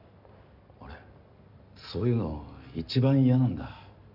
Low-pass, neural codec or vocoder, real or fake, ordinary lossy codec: 5.4 kHz; none; real; none